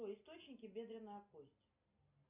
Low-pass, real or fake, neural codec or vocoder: 3.6 kHz; real; none